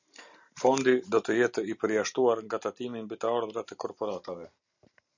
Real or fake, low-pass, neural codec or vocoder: real; 7.2 kHz; none